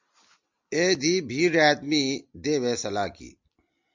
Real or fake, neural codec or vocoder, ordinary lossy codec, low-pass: real; none; MP3, 64 kbps; 7.2 kHz